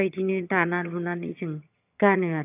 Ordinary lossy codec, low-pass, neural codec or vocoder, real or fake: AAC, 32 kbps; 3.6 kHz; vocoder, 22.05 kHz, 80 mel bands, HiFi-GAN; fake